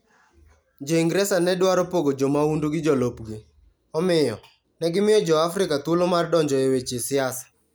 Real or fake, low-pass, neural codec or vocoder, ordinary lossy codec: real; none; none; none